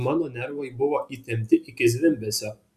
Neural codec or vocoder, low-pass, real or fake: none; 14.4 kHz; real